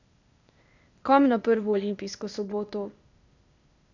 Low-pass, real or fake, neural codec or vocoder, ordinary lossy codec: 7.2 kHz; fake; codec, 16 kHz, 0.8 kbps, ZipCodec; none